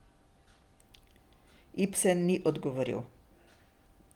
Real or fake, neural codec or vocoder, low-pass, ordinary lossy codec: real; none; 19.8 kHz; Opus, 24 kbps